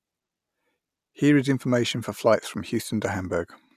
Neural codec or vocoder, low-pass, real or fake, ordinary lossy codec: none; 14.4 kHz; real; none